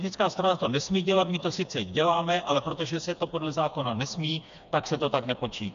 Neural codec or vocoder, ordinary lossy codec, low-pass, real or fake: codec, 16 kHz, 2 kbps, FreqCodec, smaller model; MP3, 64 kbps; 7.2 kHz; fake